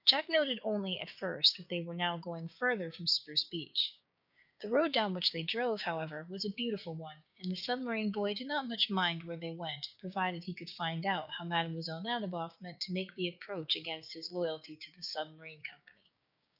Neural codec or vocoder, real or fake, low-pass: codec, 16 kHz, 6 kbps, DAC; fake; 5.4 kHz